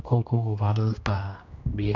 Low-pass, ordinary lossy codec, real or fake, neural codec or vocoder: 7.2 kHz; none; fake; codec, 16 kHz, 1 kbps, X-Codec, HuBERT features, trained on general audio